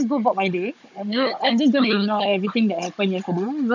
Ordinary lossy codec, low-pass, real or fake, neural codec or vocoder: none; 7.2 kHz; fake; codec, 16 kHz, 16 kbps, FunCodec, trained on Chinese and English, 50 frames a second